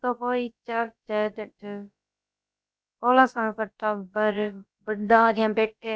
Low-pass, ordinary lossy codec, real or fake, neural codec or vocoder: none; none; fake; codec, 16 kHz, about 1 kbps, DyCAST, with the encoder's durations